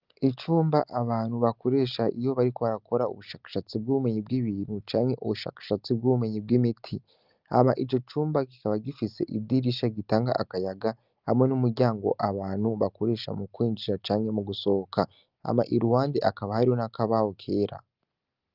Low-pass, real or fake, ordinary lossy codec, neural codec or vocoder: 5.4 kHz; real; Opus, 24 kbps; none